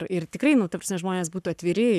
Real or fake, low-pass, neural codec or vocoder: fake; 14.4 kHz; codec, 44.1 kHz, 7.8 kbps, Pupu-Codec